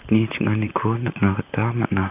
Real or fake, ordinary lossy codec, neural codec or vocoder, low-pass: fake; none; vocoder, 44.1 kHz, 128 mel bands, Pupu-Vocoder; 3.6 kHz